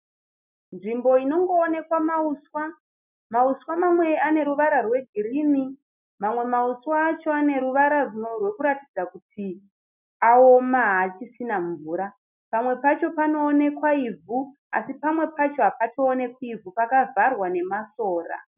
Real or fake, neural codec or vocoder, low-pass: real; none; 3.6 kHz